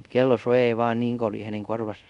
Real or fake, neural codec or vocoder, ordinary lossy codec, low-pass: fake; codec, 24 kHz, 0.5 kbps, DualCodec; none; 10.8 kHz